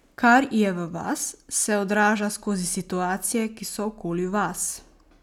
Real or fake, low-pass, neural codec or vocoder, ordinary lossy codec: real; 19.8 kHz; none; none